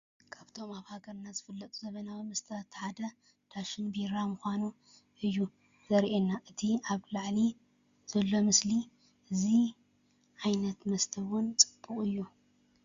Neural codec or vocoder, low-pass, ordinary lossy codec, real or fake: none; 7.2 kHz; Opus, 64 kbps; real